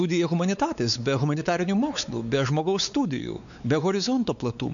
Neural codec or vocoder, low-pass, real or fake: codec, 16 kHz, 4 kbps, X-Codec, WavLM features, trained on Multilingual LibriSpeech; 7.2 kHz; fake